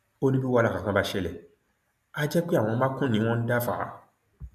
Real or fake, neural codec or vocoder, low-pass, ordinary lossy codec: real; none; 14.4 kHz; MP3, 96 kbps